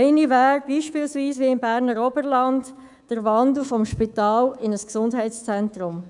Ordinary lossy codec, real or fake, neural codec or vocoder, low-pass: none; fake; codec, 24 kHz, 3.1 kbps, DualCodec; 10.8 kHz